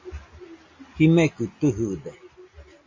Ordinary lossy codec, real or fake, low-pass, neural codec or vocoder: MP3, 32 kbps; real; 7.2 kHz; none